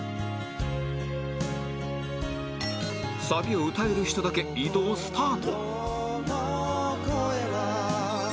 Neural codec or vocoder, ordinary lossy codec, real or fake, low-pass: none; none; real; none